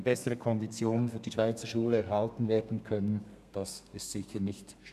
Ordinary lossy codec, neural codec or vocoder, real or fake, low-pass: none; codec, 32 kHz, 1.9 kbps, SNAC; fake; 14.4 kHz